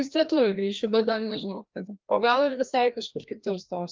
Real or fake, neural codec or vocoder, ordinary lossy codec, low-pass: fake; codec, 16 kHz, 1 kbps, FreqCodec, larger model; Opus, 32 kbps; 7.2 kHz